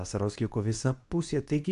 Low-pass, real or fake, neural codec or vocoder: 10.8 kHz; fake; codec, 24 kHz, 0.9 kbps, WavTokenizer, medium speech release version 2